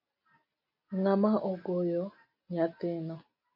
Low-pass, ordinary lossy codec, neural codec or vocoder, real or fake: 5.4 kHz; MP3, 32 kbps; none; real